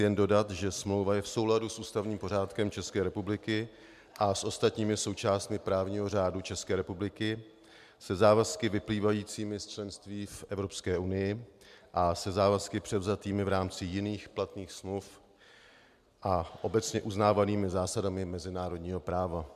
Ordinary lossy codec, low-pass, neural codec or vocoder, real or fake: MP3, 96 kbps; 14.4 kHz; none; real